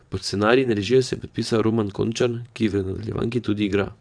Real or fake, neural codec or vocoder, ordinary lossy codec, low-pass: fake; vocoder, 22.05 kHz, 80 mel bands, WaveNeXt; none; 9.9 kHz